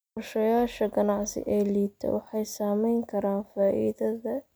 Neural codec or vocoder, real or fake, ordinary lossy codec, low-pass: none; real; none; none